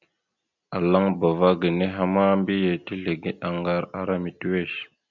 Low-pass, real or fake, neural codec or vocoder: 7.2 kHz; real; none